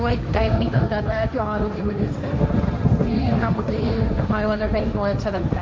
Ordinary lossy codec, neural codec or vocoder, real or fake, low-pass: none; codec, 16 kHz, 1.1 kbps, Voila-Tokenizer; fake; none